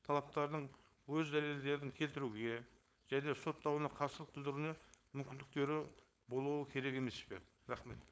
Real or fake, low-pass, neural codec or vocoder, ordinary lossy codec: fake; none; codec, 16 kHz, 4.8 kbps, FACodec; none